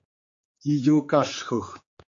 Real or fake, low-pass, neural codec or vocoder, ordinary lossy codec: fake; 7.2 kHz; codec, 16 kHz, 4 kbps, X-Codec, HuBERT features, trained on general audio; MP3, 48 kbps